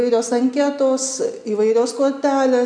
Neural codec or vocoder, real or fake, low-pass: none; real; 9.9 kHz